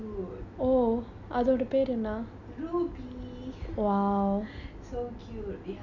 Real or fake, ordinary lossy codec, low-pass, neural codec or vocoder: real; none; 7.2 kHz; none